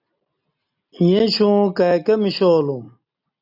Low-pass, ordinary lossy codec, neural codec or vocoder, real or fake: 5.4 kHz; AAC, 48 kbps; none; real